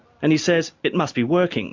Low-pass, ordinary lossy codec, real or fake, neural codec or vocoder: 7.2 kHz; MP3, 64 kbps; real; none